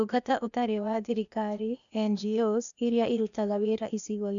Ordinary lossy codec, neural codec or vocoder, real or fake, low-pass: none; codec, 16 kHz, 0.8 kbps, ZipCodec; fake; 7.2 kHz